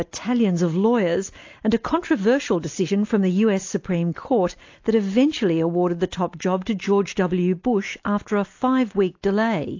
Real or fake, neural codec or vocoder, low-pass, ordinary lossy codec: real; none; 7.2 kHz; AAC, 48 kbps